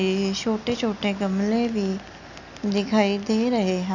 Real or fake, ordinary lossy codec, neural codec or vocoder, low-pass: real; none; none; 7.2 kHz